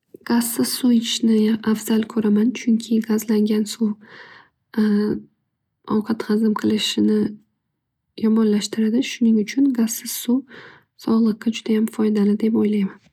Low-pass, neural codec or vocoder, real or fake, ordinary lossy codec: 19.8 kHz; none; real; none